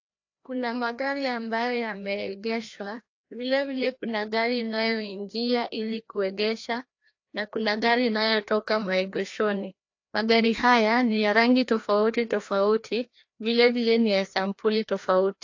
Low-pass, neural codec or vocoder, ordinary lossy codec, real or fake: 7.2 kHz; codec, 16 kHz, 1 kbps, FreqCodec, larger model; AAC, 48 kbps; fake